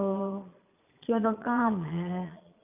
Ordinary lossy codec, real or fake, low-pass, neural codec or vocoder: none; fake; 3.6 kHz; vocoder, 22.05 kHz, 80 mel bands, Vocos